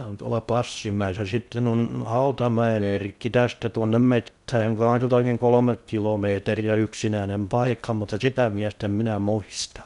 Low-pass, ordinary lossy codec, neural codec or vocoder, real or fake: 10.8 kHz; none; codec, 16 kHz in and 24 kHz out, 0.6 kbps, FocalCodec, streaming, 2048 codes; fake